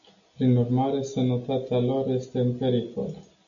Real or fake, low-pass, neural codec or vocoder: real; 7.2 kHz; none